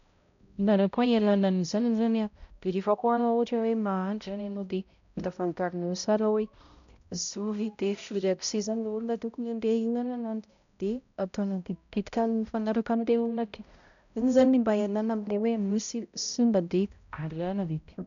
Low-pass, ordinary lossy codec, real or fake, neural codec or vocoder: 7.2 kHz; none; fake; codec, 16 kHz, 0.5 kbps, X-Codec, HuBERT features, trained on balanced general audio